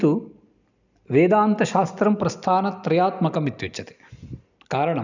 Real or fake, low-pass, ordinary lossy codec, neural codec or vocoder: real; 7.2 kHz; none; none